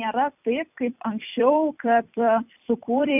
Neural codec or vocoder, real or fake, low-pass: vocoder, 44.1 kHz, 128 mel bands every 256 samples, BigVGAN v2; fake; 3.6 kHz